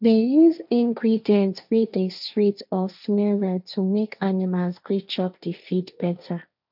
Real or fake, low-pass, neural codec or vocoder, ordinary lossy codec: fake; 5.4 kHz; codec, 16 kHz, 1.1 kbps, Voila-Tokenizer; none